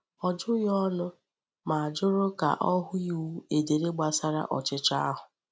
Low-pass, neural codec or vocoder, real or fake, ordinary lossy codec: none; none; real; none